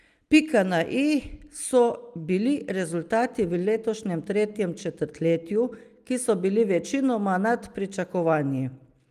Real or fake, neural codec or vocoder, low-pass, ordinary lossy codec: real; none; 14.4 kHz; Opus, 32 kbps